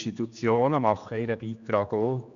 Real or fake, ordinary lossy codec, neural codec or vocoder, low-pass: fake; none; codec, 16 kHz, 2 kbps, X-Codec, HuBERT features, trained on general audio; 7.2 kHz